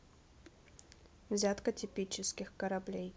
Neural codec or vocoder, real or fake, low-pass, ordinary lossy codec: none; real; none; none